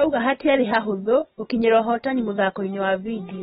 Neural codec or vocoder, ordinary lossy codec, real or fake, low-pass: none; AAC, 16 kbps; real; 14.4 kHz